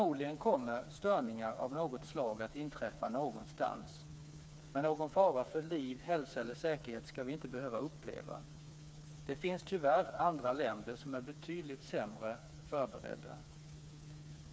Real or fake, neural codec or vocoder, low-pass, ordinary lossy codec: fake; codec, 16 kHz, 4 kbps, FreqCodec, smaller model; none; none